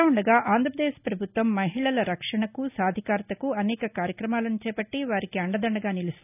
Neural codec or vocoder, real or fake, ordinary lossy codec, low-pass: none; real; none; 3.6 kHz